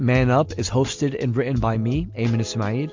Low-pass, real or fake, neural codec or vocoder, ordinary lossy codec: 7.2 kHz; real; none; MP3, 64 kbps